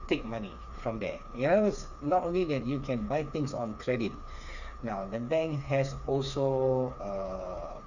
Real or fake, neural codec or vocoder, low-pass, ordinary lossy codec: fake; codec, 16 kHz, 4 kbps, FreqCodec, smaller model; 7.2 kHz; none